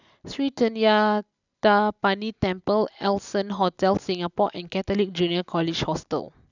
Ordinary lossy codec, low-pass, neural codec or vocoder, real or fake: none; 7.2 kHz; none; real